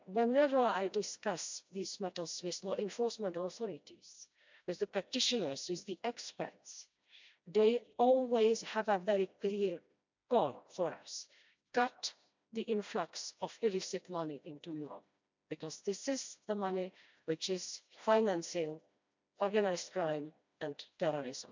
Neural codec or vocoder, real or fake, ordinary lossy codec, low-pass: codec, 16 kHz, 1 kbps, FreqCodec, smaller model; fake; MP3, 64 kbps; 7.2 kHz